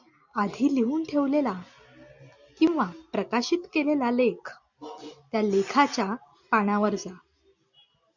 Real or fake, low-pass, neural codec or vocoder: real; 7.2 kHz; none